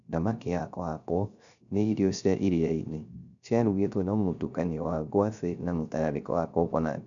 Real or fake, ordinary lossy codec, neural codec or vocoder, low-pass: fake; none; codec, 16 kHz, 0.3 kbps, FocalCodec; 7.2 kHz